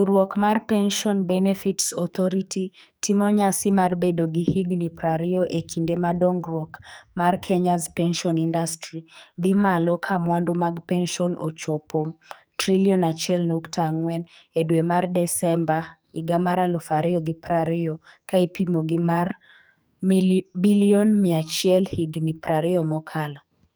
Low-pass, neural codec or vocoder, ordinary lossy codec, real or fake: none; codec, 44.1 kHz, 2.6 kbps, SNAC; none; fake